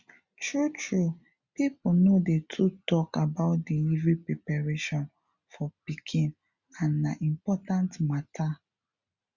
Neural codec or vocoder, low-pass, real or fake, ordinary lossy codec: none; 7.2 kHz; real; Opus, 64 kbps